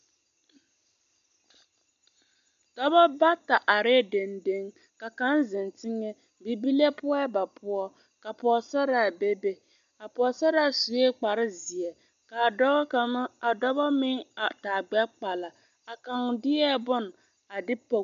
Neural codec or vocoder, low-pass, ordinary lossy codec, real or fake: none; 7.2 kHz; MP3, 64 kbps; real